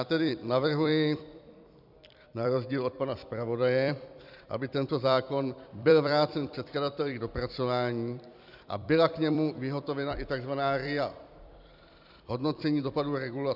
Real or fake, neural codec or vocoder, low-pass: fake; vocoder, 44.1 kHz, 128 mel bands every 256 samples, BigVGAN v2; 5.4 kHz